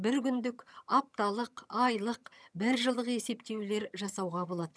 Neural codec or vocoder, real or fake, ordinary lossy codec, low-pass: vocoder, 22.05 kHz, 80 mel bands, HiFi-GAN; fake; none; none